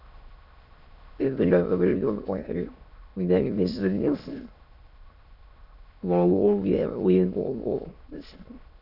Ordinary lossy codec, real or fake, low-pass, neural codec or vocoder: none; fake; 5.4 kHz; autoencoder, 22.05 kHz, a latent of 192 numbers a frame, VITS, trained on many speakers